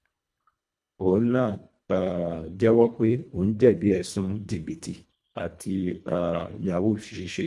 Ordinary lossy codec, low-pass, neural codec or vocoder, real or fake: none; none; codec, 24 kHz, 1.5 kbps, HILCodec; fake